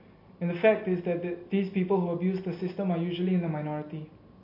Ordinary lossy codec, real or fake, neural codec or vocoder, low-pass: MP3, 32 kbps; real; none; 5.4 kHz